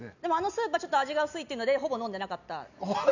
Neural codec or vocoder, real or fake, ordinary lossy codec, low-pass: none; real; none; 7.2 kHz